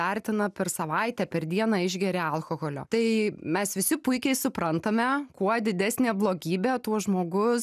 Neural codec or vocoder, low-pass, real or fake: none; 14.4 kHz; real